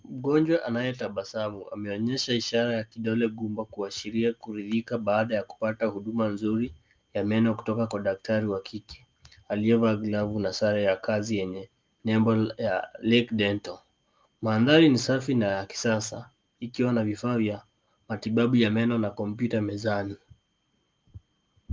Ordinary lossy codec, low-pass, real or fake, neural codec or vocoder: Opus, 24 kbps; 7.2 kHz; real; none